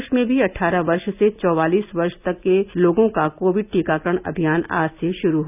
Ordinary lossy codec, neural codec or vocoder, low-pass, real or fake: none; none; 3.6 kHz; real